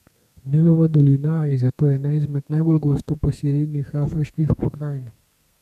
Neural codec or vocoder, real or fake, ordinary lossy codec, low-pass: codec, 32 kHz, 1.9 kbps, SNAC; fake; none; 14.4 kHz